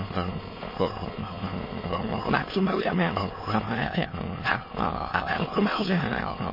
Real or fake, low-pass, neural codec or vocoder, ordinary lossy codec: fake; 5.4 kHz; autoencoder, 22.05 kHz, a latent of 192 numbers a frame, VITS, trained on many speakers; MP3, 24 kbps